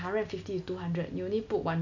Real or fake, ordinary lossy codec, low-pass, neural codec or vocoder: real; none; 7.2 kHz; none